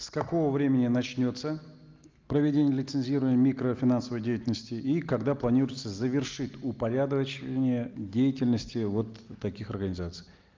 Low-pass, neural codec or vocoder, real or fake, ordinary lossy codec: 7.2 kHz; none; real; Opus, 32 kbps